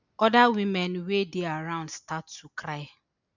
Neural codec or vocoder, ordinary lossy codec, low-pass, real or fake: none; none; 7.2 kHz; real